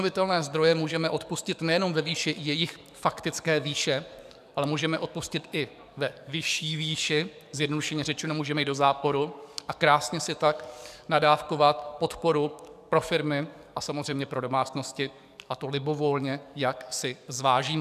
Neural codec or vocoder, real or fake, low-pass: codec, 44.1 kHz, 7.8 kbps, DAC; fake; 14.4 kHz